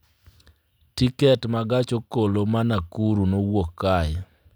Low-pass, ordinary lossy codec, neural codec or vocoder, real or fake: none; none; none; real